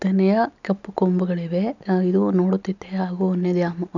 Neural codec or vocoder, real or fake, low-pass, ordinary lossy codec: none; real; 7.2 kHz; none